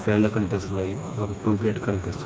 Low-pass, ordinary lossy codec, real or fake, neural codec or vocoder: none; none; fake; codec, 16 kHz, 2 kbps, FreqCodec, smaller model